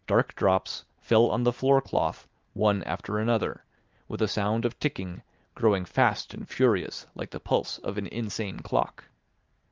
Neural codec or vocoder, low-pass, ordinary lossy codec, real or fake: none; 7.2 kHz; Opus, 32 kbps; real